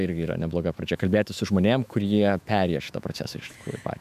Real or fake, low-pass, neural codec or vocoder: fake; 14.4 kHz; autoencoder, 48 kHz, 128 numbers a frame, DAC-VAE, trained on Japanese speech